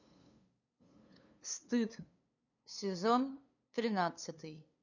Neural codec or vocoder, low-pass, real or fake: codec, 16 kHz, 2 kbps, FunCodec, trained on LibriTTS, 25 frames a second; 7.2 kHz; fake